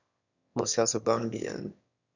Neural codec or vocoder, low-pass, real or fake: autoencoder, 22.05 kHz, a latent of 192 numbers a frame, VITS, trained on one speaker; 7.2 kHz; fake